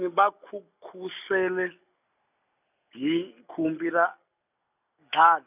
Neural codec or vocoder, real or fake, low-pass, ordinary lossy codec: none; real; 3.6 kHz; none